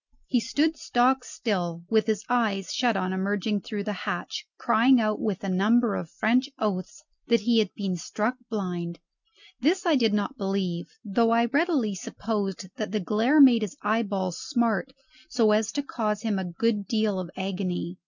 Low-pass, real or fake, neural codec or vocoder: 7.2 kHz; real; none